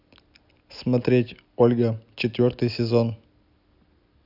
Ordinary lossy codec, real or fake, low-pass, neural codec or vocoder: none; real; 5.4 kHz; none